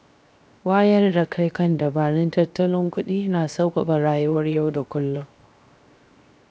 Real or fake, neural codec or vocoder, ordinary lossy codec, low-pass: fake; codec, 16 kHz, 0.7 kbps, FocalCodec; none; none